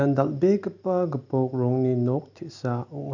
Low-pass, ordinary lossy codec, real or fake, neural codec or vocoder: 7.2 kHz; none; real; none